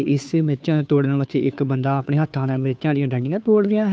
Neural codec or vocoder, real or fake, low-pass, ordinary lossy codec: codec, 16 kHz, 2 kbps, FunCodec, trained on Chinese and English, 25 frames a second; fake; none; none